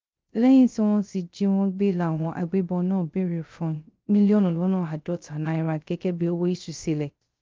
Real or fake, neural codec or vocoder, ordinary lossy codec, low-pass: fake; codec, 16 kHz, 0.3 kbps, FocalCodec; Opus, 24 kbps; 7.2 kHz